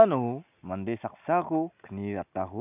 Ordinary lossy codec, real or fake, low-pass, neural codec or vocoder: none; real; 3.6 kHz; none